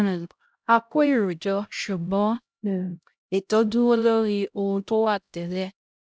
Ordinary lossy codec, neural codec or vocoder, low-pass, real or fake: none; codec, 16 kHz, 0.5 kbps, X-Codec, HuBERT features, trained on LibriSpeech; none; fake